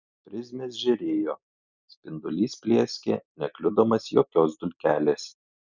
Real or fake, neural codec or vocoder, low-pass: real; none; 7.2 kHz